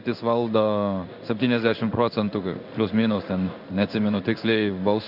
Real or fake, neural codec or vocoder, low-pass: fake; codec, 16 kHz in and 24 kHz out, 1 kbps, XY-Tokenizer; 5.4 kHz